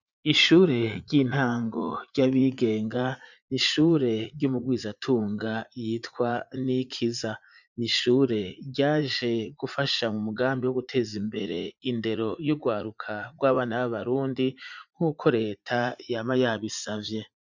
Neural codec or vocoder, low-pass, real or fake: vocoder, 44.1 kHz, 80 mel bands, Vocos; 7.2 kHz; fake